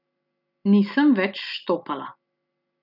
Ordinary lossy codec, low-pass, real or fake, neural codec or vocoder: none; 5.4 kHz; real; none